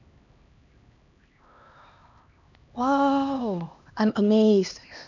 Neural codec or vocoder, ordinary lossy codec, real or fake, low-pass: codec, 16 kHz, 2 kbps, X-Codec, HuBERT features, trained on LibriSpeech; none; fake; 7.2 kHz